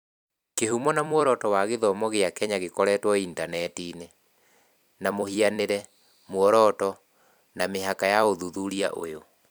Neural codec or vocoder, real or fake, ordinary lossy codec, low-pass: vocoder, 44.1 kHz, 128 mel bands every 256 samples, BigVGAN v2; fake; none; none